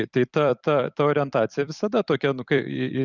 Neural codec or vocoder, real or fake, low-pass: vocoder, 44.1 kHz, 128 mel bands every 256 samples, BigVGAN v2; fake; 7.2 kHz